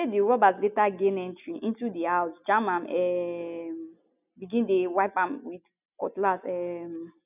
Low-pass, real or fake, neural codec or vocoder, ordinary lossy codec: 3.6 kHz; real; none; none